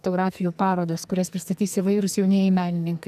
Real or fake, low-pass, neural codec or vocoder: fake; 14.4 kHz; codec, 44.1 kHz, 2.6 kbps, SNAC